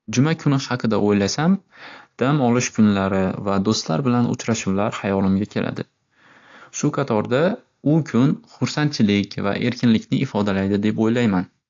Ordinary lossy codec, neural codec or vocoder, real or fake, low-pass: AAC, 48 kbps; none; real; 7.2 kHz